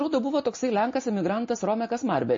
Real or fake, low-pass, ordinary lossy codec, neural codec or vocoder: real; 7.2 kHz; MP3, 32 kbps; none